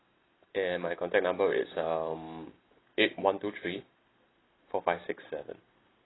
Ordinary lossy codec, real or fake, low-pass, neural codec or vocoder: AAC, 16 kbps; real; 7.2 kHz; none